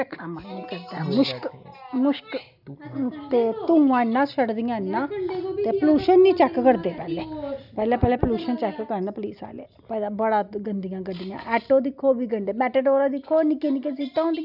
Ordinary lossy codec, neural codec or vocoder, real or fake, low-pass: none; none; real; 5.4 kHz